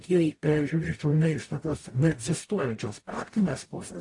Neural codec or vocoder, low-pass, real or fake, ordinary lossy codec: codec, 44.1 kHz, 0.9 kbps, DAC; 10.8 kHz; fake; AAC, 48 kbps